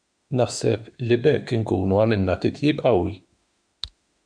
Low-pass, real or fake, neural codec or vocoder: 9.9 kHz; fake; autoencoder, 48 kHz, 32 numbers a frame, DAC-VAE, trained on Japanese speech